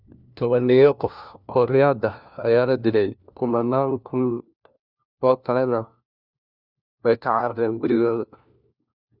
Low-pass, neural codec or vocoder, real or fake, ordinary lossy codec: 5.4 kHz; codec, 16 kHz, 1 kbps, FunCodec, trained on LibriTTS, 50 frames a second; fake; none